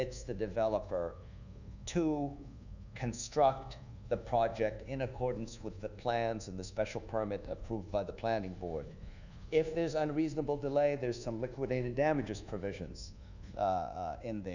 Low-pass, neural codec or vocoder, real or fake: 7.2 kHz; codec, 24 kHz, 1.2 kbps, DualCodec; fake